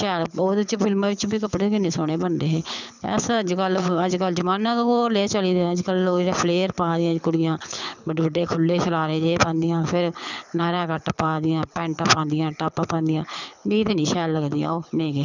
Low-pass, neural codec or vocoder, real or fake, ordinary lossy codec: 7.2 kHz; codec, 24 kHz, 6 kbps, HILCodec; fake; none